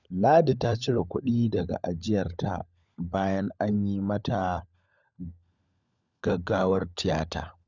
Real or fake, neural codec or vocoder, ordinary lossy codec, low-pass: fake; codec, 16 kHz, 16 kbps, FunCodec, trained on LibriTTS, 50 frames a second; none; 7.2 kHz